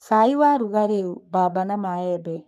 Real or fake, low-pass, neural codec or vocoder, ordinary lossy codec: fake; 14.4 kHz; codec, 44.1 kHz, 3.4 kbps, Pupu-Codec; none